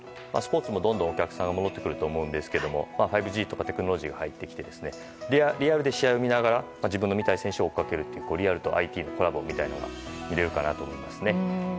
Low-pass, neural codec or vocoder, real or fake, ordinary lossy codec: none; none; real; none